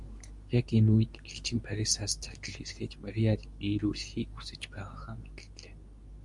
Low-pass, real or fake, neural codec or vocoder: 10.8 kHz; fake; codec, 24 kHz, 0.9 kbps, WavTokenizer, medium speech release version 2